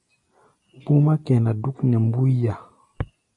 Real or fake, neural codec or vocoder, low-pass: real; none; 10.8 kHz